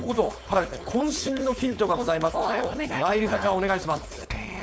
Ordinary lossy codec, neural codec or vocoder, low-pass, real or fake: none; codec, 16 kHz, 4.8 kbps, FACodec; none; fake